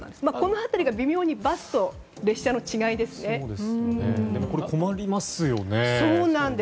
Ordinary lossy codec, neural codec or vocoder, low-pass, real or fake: none; none; none; real